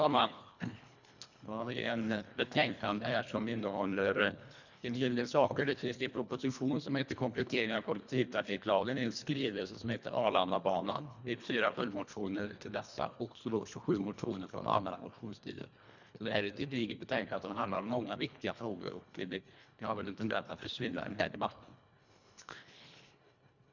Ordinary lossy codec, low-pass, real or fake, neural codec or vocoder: none; 7.2 kHz; fake; codec, 24 kHz, 1.5 kbps, HILCodec